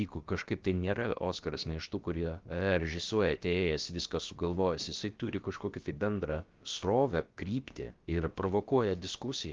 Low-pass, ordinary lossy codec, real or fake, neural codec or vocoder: 7.2 kHz; Opus, 32 kbps; fake; codec, 16 kHz, about 1 kbps, DyCAST, with the encoder's durations